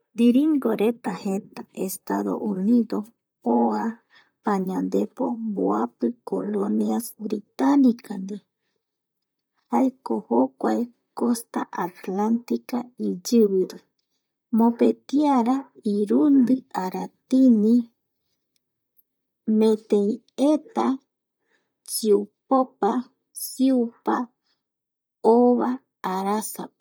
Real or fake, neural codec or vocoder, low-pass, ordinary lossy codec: fake; vocoder, 44.1 kHz, 128 mel bands, Pupu-Vocoder; none; none